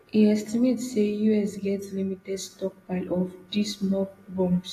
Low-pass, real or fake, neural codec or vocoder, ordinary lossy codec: 14.4 kHz; real; none; AAC, 48 kbps